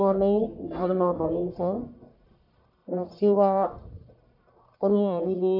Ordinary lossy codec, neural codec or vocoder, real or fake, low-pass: none; codec, 44.1 kHz, 1.7 kbps, Pupu-Codec; fake; 5.4 kHz